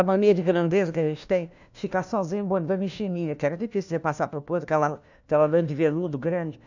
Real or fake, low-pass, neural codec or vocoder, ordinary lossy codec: fake; 7.2 kHz; codec, 16 kHz, 1 kbps, FunCodec, trained on LibriTTS, 50 frames a second; none